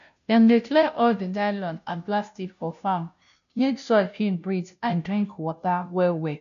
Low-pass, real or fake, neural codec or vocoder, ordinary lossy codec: 7.2 kHz; fake; codec, 16 kHz, 0.5 kbps, FunCodec, trained on Chinese and English, 25 frames a second; none